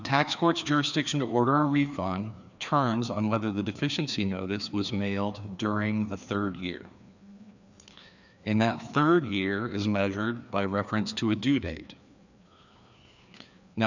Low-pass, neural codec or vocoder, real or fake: 7.2 kHz; codec, 16 kHz, 2 kbps, FreqCodec, larger model; fake